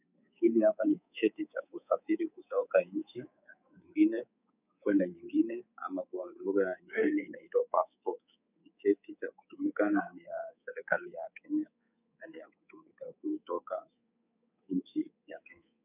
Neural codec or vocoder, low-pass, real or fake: codec, 24 kHz, 3.1 kbps, DualCodec; 3.6 kHz; fake